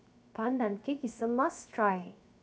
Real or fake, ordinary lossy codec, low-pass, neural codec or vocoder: fake; none; none; codec, 16 kHz, 0.7 kbps, FocalCodec